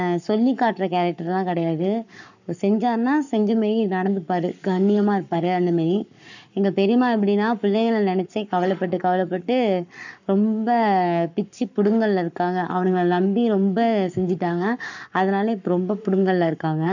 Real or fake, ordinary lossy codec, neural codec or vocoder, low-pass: fake; none; codec, 44.1 kHz, 7.8 kbps, Pupu-Codec; 7.2 kHz